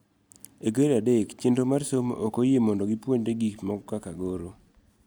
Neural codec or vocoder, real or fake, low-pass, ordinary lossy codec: none; real; none; none